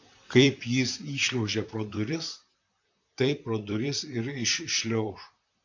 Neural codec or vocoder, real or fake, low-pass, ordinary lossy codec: vocoder, 44.1 kHz, 128 mel bands, Pupu-Vocoder; fake; 7.2 kHz; AAC, 48 kbps